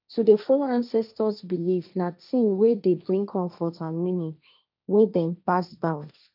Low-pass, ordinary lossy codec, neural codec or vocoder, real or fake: 5.4 kHz; none; codec, 16 kHz, 1.1 kbps, Voila-Tokenizer; fake